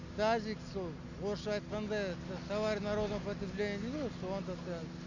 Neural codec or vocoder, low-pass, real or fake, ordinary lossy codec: none; 7.2 kHz; real; none